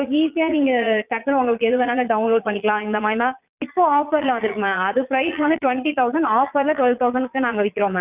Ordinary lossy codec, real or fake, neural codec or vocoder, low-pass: Opus, 64 kbps; fake; vocoder, 22.05 kHz, 80 mel bands, Vocos; 3.6 kHz